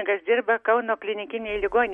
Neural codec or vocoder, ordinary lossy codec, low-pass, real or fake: none; MP3, 48 kbps; 19.8 kHz; real